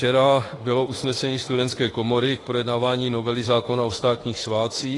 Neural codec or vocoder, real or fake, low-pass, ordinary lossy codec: autoencoder, 48 kHz, 32 numbers a frame, DAC-VAE, trained on Japanese speech; fake; 10.8 kHz; AAC, 32 kbps